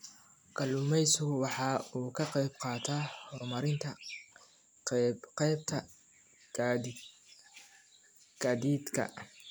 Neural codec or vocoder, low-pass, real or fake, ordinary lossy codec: none; none; real; none